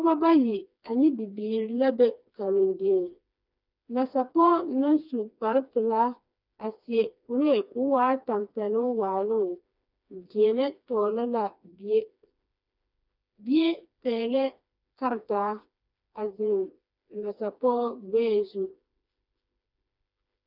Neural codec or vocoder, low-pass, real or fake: codec, 16 kHz, 2 kbps, FreqCodec, smaller model; 5.4 kHz; fake